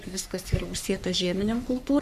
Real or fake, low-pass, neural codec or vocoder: fake; 14.4 kHz; codec, 44.1 kHz, 3.4 kbps, Pupu-Codec